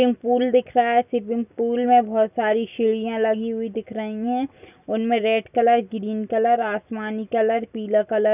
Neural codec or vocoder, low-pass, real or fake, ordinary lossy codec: none; 3.6 kHz; real; none